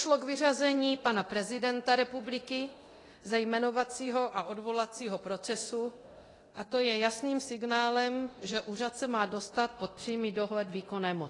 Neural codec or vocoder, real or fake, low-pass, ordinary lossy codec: codec, 24 kHz, 0.9 kbps, DualCodec; fake; 10.8 kHz; AAC, 32 kbps